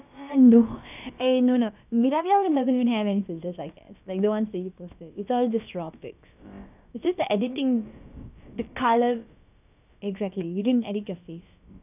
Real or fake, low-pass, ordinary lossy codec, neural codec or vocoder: fake; 3.6 kHz; none; codec, 16 kHz, about 1 kbps, DyCAST, with the encoder's durations